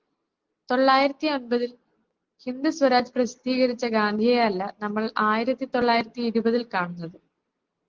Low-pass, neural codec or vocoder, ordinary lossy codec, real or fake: 7.2 kHz; none; Opus, 16 kbps; real